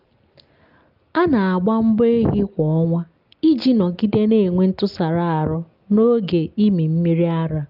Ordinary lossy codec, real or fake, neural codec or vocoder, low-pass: Opus, 24 kbps; real; none; 5.4 kHz